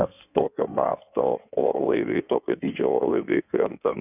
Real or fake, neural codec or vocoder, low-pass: fake; codec, 16 kHz in and 24 kHz out, 1.1 kbps, FireRedTTS-2 codec; 3.6 kHz